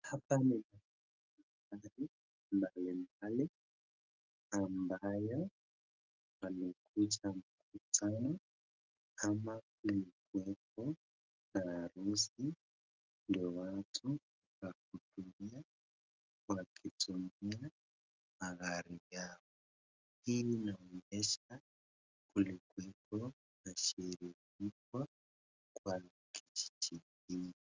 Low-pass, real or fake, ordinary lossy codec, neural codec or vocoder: 7.2 kHz; real; Opus, 32 kbps; none